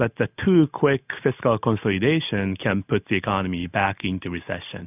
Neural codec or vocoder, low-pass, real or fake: none; 3.6 kHz; real